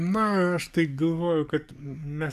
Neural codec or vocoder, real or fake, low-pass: codec, 44.1 kHz, 7.8 kbps, DAC; fake; 14.4 kHz